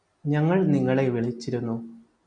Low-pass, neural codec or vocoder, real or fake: 9.9 kHz; none; real